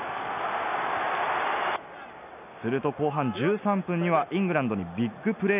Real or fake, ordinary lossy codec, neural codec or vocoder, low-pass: real; none; none; 3.6 kHz